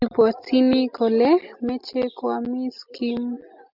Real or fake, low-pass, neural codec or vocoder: real; 5.4 kHz; none